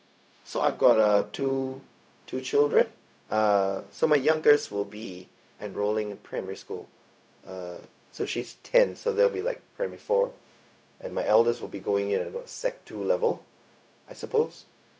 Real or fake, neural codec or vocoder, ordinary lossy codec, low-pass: fake; codec, 16 kHz, 0.4 kbps, LongCat-Audio-Codec; none; none